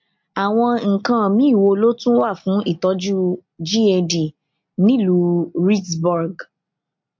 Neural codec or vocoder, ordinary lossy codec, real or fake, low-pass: none; MP3, 48 kbps; real; 7.2 kHz